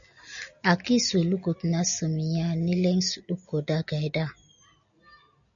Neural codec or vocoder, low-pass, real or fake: none; 7.2 kHz; real